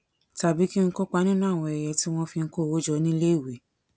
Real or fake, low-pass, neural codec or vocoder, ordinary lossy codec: real; none; none; none